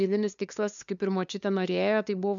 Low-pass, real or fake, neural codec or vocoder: 7.2 kHz; fake; codec, 16 kHz, 2 kbps, FunCodec, trained on LibriTTS, 25 frames a second